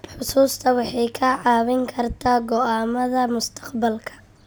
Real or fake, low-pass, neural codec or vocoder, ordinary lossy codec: real; none; none; none